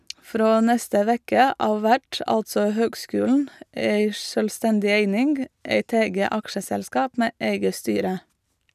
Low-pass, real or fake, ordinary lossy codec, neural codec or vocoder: 14.4 kHz; real; none; none